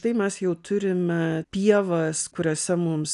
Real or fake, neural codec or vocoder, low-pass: real; none; 10.8 kHz